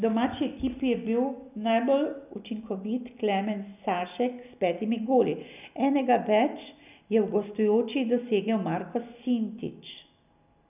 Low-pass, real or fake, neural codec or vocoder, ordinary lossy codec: 3.6 kHz; real; none; none